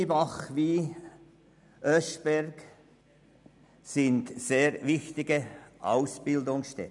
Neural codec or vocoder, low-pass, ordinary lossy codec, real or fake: none; 10.8 kHz; none; real